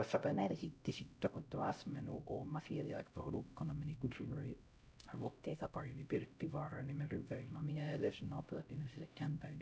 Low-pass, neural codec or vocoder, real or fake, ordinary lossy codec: none; codec, 16 kHz, 0.5 kbps, X-Codec, HuBERT features, trained on LibriSpeech; fake; none